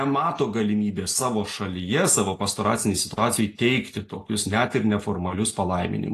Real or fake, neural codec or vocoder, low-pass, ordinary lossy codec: real; none; 14.4 kHz; AAC, 48 kbps